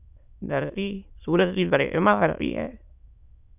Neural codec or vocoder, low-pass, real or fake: autoencoder, 22.05 kHz, a latent of 192 numbers a frame, VITS, trained on many speakers; 3.6 kHz; fake